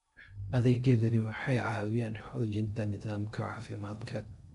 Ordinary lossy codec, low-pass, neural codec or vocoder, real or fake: none; 10.8 kHz; codec, 16 kHz in and 24 kHz out, 0.6 kbps, FocalCodec, streaming, 2048 codes; fake